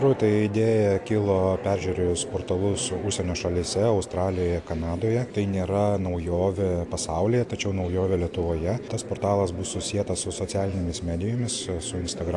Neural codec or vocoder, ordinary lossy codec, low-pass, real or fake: none; AAC, 64 kbps; 10.8 kHz; real